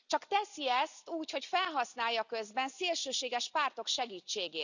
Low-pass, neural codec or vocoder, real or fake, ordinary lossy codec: 7.2 kHz; none; real; none